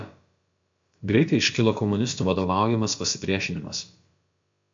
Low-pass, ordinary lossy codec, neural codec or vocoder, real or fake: 7.2 kHz; MP3, 48 kbps; codec, 16 kHz, about 1 kbps, DyCAST, with the encoder's durations; fake